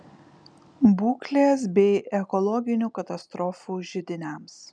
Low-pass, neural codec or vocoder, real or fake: 9.9 kHz; none; real